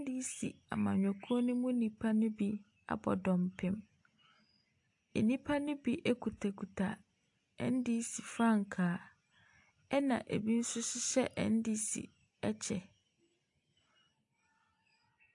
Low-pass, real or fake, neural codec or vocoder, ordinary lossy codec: 10.8 kHz; real; none; AAC, 64 kbps